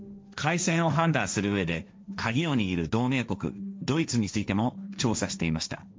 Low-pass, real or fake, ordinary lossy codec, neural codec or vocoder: none; fake; none; codec, 16 kHz, 1.1 kbps, Voila-Tokenizer